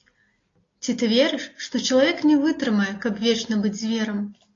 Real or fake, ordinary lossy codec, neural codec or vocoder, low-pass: real; AAC, 32 kbps; none; 7.2 kHz